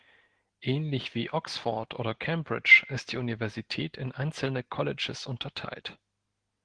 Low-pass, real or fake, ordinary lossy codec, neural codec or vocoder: 9.9 kHz; real; Opus, 16 kbps; none